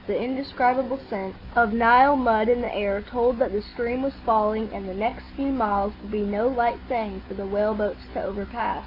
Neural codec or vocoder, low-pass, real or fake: none; 5.4 kHz; real